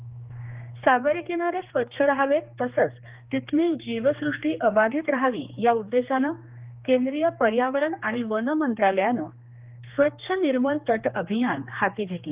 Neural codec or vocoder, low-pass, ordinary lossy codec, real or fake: codec, 16 kHz, 2 kbps, X-Codec, HuBERT features, trained on general audio; 3.6 kHz; Opus, 32 kbps; fake